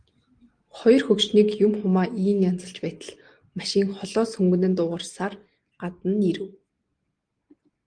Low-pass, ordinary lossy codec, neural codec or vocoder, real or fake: 9.9 kHz; Opus, 24 kbps; none; real